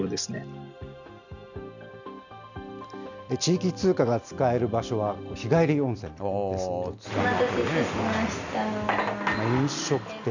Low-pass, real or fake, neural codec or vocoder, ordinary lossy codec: 7.2 kHz; real; none; none